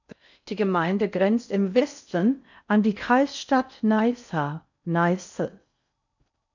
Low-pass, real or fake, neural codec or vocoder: 7.2 kHz; fake; codec, 16 kHz in and 24 kHz out, 0.6 kbps, FocalCodec, streaming, 4096 codes